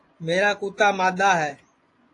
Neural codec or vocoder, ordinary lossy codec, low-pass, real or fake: vocoder, 24 kHz, 100 mel bands, Vocos; AAC, 48 kbps; 10.8 kHz; fake